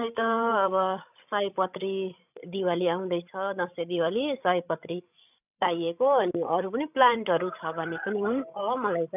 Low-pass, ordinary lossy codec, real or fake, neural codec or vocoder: 3.6 kHz; none; fake; codec, 16 kHz, 16 kbps, FreqCodec, larger model